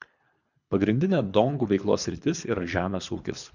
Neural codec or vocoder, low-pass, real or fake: codec, 24 kHz, 3 kbps, HILCodec; 7.2 kHz; fake